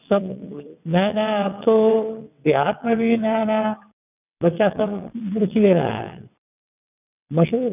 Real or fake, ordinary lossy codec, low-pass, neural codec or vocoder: fake; none; 3.6 kHz; vocoder, 22.05 kHz, 80 mel bands, WaveNeXt